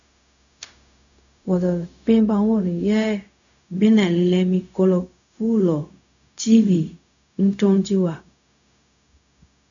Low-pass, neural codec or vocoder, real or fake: 7.2 kHz; codec, 16 kHz, 0.4 kbps, LongCat-Audio-Codec; fake